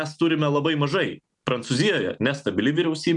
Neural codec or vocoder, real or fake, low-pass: none; real; 10.8 kHz